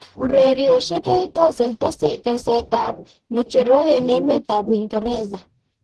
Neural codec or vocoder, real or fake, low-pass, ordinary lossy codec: codec, 44.1 kHz, 0.9 kbps, DAC; fake; 10.8 kHz; Opus, 16 kbps